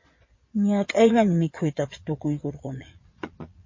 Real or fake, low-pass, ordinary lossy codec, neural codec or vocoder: fake; 7.2 kHz; MP3, 32 kbps; vocoder, 22.05 kHz, 80 mel bands, Vocos